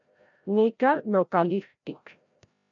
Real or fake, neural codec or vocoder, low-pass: fake; codec, 16 kHz, 0.5 kbps, FreqCodec, larger model; 7.2 kHz